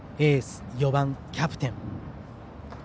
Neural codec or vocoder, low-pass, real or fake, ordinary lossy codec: none; none; real; none